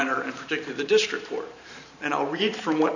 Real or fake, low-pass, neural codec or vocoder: fake; 7.2 kHz; vocoder, 44.1 kHz, 128 mel bands every 512 samples, BigVGAN v2